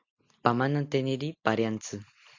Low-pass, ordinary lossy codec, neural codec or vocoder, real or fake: 7.2 kHz; AAC, 48 kbps; none; real